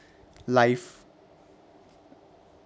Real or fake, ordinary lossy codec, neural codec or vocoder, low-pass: real; none; none; none